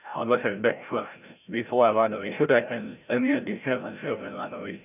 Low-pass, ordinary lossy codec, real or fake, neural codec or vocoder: 3.6 kHz; none; fake; codec, 16 kHz, 0.5 kbps, FreqCodec, larger model